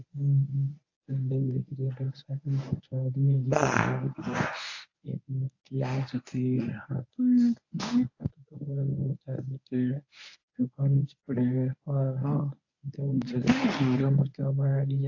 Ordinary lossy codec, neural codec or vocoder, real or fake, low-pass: AAC, 48 kbps; codec, 24 kHz, 0.9 kbps, WavTokenizer, medium speech release version 1; fake; 7.2 kHz